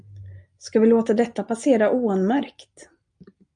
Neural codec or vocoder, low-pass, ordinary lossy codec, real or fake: none; 9.9 kHz; MP3, 96 kbps; real